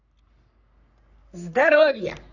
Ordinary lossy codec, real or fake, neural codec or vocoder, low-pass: none; fake; codec, 44.1 kHz, 3.4 kbps, Pupu-Codec; 7.2 kHz